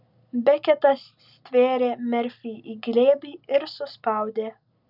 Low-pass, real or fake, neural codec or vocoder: 5.4 kHz; real; none